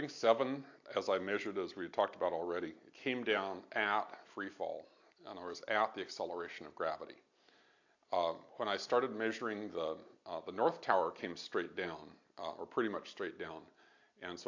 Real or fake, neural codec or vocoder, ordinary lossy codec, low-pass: real; none; AAC, 48 kbps; 7.2 kHz